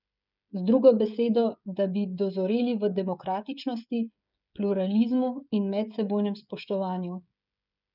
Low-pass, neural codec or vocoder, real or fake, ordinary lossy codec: 5.4 kHz; codec, 16 kHz, 16 kbps, FreqCodec, smaller model; fake; none